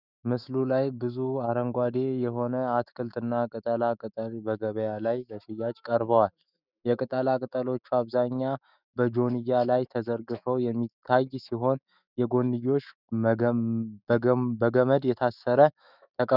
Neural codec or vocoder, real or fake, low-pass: autoencoder, 48 kHz, 128 numbers a frame, DAC-VAE, trained on Japanese speech; fake; 5.4 kHz